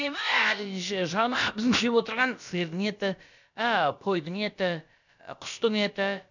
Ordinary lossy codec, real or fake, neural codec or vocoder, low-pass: none; fake; codec, 16 kHz, about 1 kbps, DyCAST, with the encoder's durations; 7.2 kHz